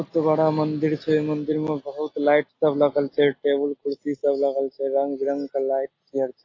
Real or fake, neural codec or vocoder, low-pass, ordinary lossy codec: real; none; 7.2 kHz; AAC, 32 kbps